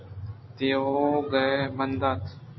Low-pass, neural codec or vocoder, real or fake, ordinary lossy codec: 7.2 kHz; none; real; MP3, 24 kbps